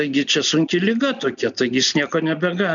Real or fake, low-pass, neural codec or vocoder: real; 7.2 kHz; none